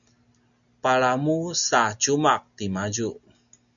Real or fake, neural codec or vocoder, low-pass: real; none; 7.2 kHz